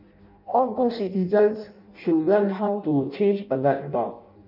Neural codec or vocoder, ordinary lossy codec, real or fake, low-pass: codec, 16 kHz in and 24 kHz out, 0.6 kbps, FireRedTTS-2 codec; none; fake; 5.4 kHz